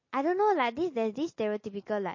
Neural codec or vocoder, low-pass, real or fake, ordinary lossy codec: none; 7.2 kHz; real; MP3, 32 kbps